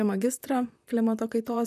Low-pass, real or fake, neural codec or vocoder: 14.4 kHz; fake; vocoder, 44.1 kHz, 128 mel bands, Pupu-Vocoder